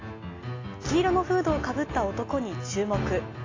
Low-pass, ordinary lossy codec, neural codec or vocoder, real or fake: 7.2 kHz; AAC, 32 kbps; none; real